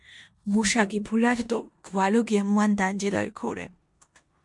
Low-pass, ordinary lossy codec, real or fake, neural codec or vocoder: 10.8 kHz; MP3, 64 kbps; fake; codec, 16 kHz in and 24 kHz out, 0.9 kbps, LongCat-Audio-Codec, four codebook decoder